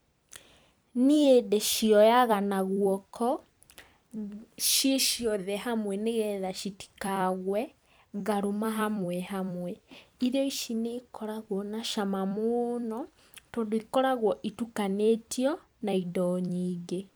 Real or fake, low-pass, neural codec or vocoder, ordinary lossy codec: fake; none; vocoder, 44.1 kHz, 128 mel bands every 256 samples, BigVGAN v2; none